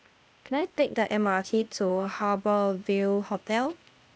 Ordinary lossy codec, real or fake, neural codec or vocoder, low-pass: none; fake; codec, 16 kHz, 0.8 kbps, ZipCodec; none